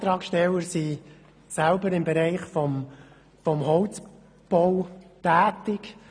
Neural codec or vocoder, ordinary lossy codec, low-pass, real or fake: none; none; 9.9 kHz; real